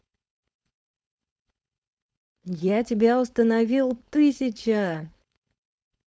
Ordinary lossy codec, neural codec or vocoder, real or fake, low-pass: none; codec, 16 kHz, 4.8 kbps, FACodec; fake; none